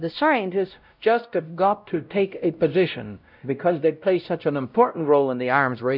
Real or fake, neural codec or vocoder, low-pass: fake; codec, 16 kHz, 0.5 kbps, X-Codec, WavLM features, trained on Multilingual LibriSpeech; 5.4 kHz